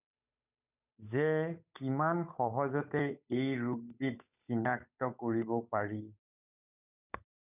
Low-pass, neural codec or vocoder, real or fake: 3.6 kHz; codec, 16 kHz, 8 kbps, FunCodec, trained on Chinese and English, 25 frames a second; fake